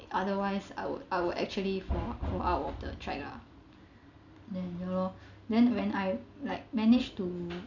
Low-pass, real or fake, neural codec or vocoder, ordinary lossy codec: 7.2 kHz; real; none; none